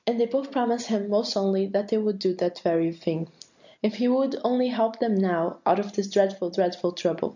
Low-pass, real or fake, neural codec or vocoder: 7.2 kHz; real; none